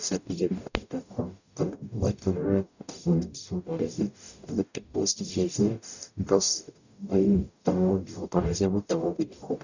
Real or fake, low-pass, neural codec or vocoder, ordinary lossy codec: fake; 7.2 kHz; codec, 44.1 kHz, 0.9 kbps, DAC; none